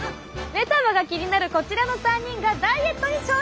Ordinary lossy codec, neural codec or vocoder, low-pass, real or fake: none; none; none; real